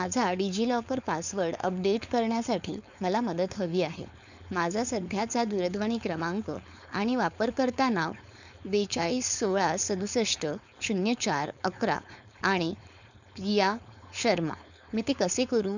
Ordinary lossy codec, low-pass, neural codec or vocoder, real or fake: none; 7.2 kHz; codec, 16 kHz, 4.8 kbps, FACodec; fake